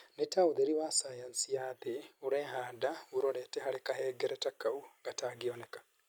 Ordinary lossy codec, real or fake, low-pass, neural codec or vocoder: none; real; none; none